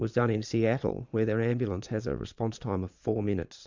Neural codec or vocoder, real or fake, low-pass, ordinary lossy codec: vocoder, 22.05 kHz, 80 mel bands, Vocos; fake; 7.2 kHz; MP3, 64 kbps